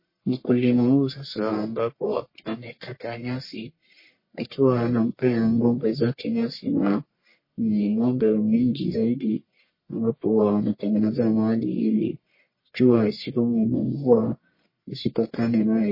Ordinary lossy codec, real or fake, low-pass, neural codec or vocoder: MP3, 24 kbps; fake; 5.4 kHz; codec, 44.1 kHz, 1.7 kbps, Pupu-Codec